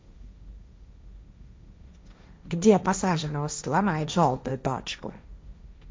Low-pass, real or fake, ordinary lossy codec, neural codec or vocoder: none; fake; none; codec, 16 kHz, 1.1 kbps, Voila-Tokenizer